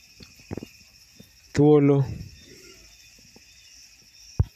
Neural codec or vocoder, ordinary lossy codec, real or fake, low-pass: none; none; real; 14.4 kHz